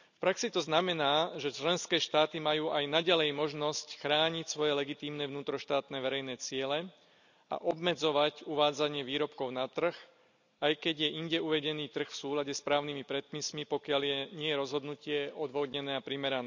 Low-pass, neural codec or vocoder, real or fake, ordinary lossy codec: 7.2 kHz; none; real; none